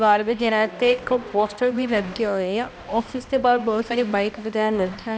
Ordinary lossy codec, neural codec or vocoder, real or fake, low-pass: none; codec, 16 kHz, 1 kbps, X-Codec, HuBERT features, trained on balanced general audio; fake; none